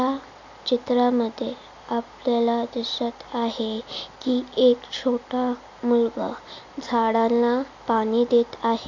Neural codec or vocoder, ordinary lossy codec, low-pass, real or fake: none; AAC, 48 kbps; 7.2 kHz; real